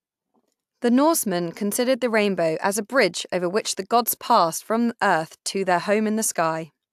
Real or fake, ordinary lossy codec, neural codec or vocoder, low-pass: real; AAC, 96 kbps; none; 14.4 kHz